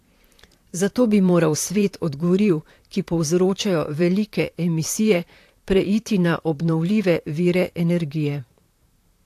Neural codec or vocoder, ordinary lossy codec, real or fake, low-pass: vocoder, 44.1 kHz, 128 mel bands, Pupu-Vocoder; AAC, 64 kbps; fake; 14.4 kHz